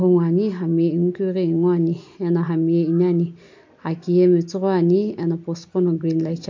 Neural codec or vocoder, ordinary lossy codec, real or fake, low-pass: none; MP3, 48 kbps; real; 7.2 kHz